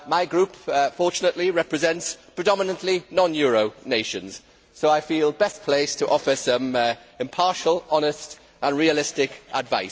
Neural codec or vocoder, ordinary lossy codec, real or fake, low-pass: none; none; real; none